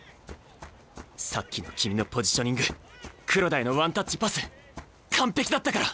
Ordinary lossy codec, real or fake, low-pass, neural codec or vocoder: none; real; none; none